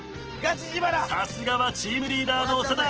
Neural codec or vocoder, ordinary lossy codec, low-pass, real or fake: none; Opus, 16 kbps; 7.2 kHz; real